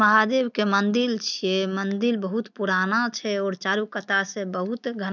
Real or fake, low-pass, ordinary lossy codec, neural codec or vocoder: real; 7.2 kHz; none; none